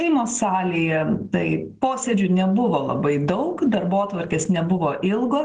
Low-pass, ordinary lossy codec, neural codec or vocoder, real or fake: 10.8 kHz; Opus, 16 kbps; none; real